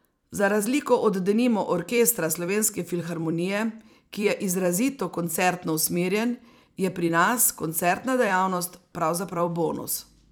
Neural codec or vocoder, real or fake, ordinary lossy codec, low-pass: vocoder, 44.1 kHz, 128 mel bands every 256 samples, BigVGAN v2; fake; none; none